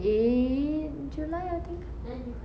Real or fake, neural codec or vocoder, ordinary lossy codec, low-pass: real; none; none; none